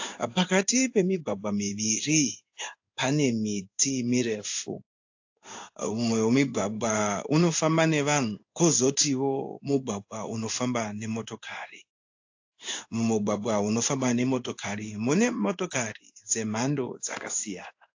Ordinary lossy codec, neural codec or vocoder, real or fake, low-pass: AAC, 48 kbps; codec, 16 kHz in and 24 kHz out, 1 kbps, XY-Tokenizer; fake; 7.2 kHz